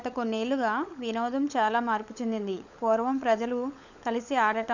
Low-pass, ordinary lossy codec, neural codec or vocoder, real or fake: 7.2 kHz; none; codec, 16 kHz, 16 kbps, FunCodec, trained on LibriTTS, 50 frames a second; fake